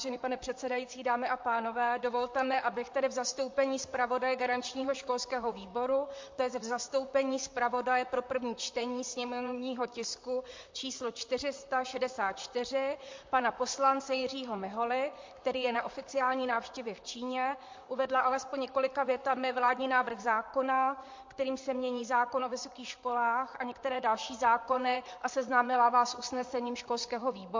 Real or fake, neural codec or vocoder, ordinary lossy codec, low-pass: fake; vocoder, 44.1 kHz, 128 mel bands, Pupu-Vocoder; MP3, 48 kbps; 7.2 kHz